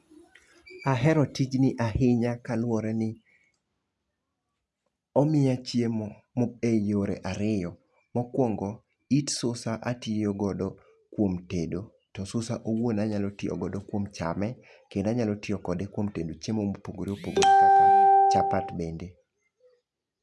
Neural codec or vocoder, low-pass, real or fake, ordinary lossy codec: none; none; real; none